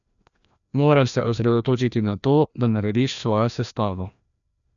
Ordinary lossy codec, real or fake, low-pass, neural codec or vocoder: none; fake; 7.2 kHz; codec, 16 kHz, 1 kbps, FreqCodec, larger model